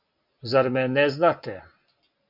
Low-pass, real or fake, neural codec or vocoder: 5.4 kHz; real; none